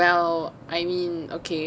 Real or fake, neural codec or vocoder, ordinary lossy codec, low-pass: real; none; none; none